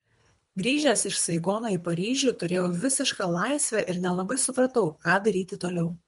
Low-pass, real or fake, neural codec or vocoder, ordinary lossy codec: 10.8 kHz; fake; codec, 24 kHz, 3 kbps, HILCodec; MP3, 64 kbps